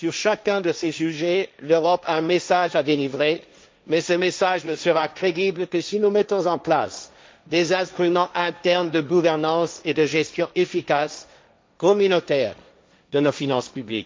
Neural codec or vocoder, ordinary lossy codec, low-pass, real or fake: codec, 16 kHz, 1.1 kbps, Voila-Tokenizer; none; none; fake